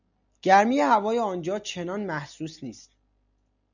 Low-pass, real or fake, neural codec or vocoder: 7.2 kHz; real; none